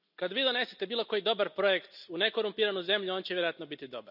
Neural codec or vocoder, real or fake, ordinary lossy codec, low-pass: none; real; none; 5.4 kHz